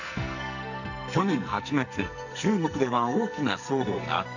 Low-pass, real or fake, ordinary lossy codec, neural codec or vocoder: 7.2 kHz; fake; none; codec, 44.1 kHz, 2.6 kbps, SNAC